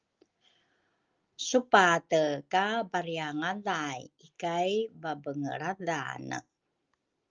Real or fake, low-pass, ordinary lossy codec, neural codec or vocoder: real; 7.2 kHz; Opus, 24 kbps; none